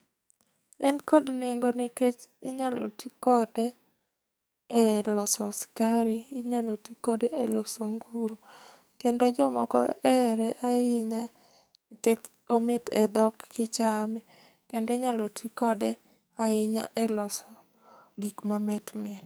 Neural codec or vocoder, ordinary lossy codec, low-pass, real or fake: codec, 44.1 kHz, 2.6 kbps, SNAC; none; none; fake